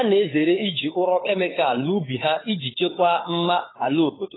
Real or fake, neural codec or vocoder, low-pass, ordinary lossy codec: fake; codec, 16 kHz, 4 kbps, FunCodec, trained on LibriTTS, 50 frames a second; 7.2 kHz; AAC, 16 kbps